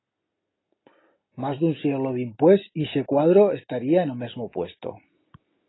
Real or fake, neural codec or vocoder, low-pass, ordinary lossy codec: real; none; 7.2 kHz; AAC, 16 kbps